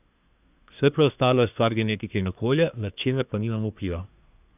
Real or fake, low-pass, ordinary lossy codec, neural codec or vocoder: fake; 3.6 kHz; none; codec, 24 kHz, 1 kbps, SNAC